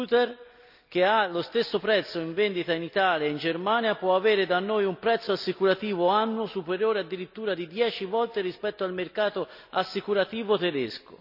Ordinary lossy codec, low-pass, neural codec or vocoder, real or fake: none; 5.4 kHz; none; real